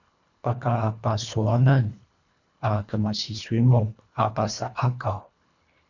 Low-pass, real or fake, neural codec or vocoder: 7.2 kHz; fake; codec, 24 kHz, 1.5 kbps, HILCodec